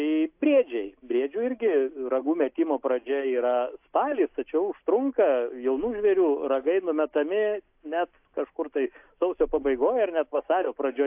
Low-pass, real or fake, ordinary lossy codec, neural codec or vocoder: 3.6 kHz; real; AAC, 32 kbps; none